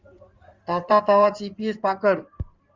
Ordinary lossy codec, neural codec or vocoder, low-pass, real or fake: Opus, 32 kbps; codec, 16 kHz in and 24 kHz out, 2.2 kbps, FireRedTTS-2 codec; 7.2 kHz; fake